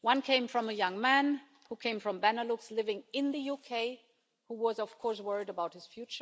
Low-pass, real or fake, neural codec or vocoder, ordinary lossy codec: none; real; none; none